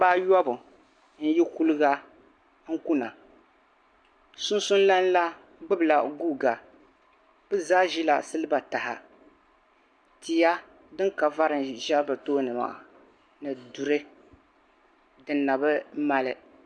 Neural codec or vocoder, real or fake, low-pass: none; real; 9.9 kHz